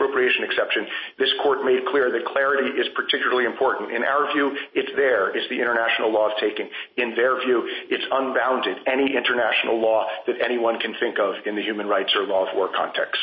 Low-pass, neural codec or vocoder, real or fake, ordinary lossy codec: 7.2 kHz; none; real; MP3, 24 kbps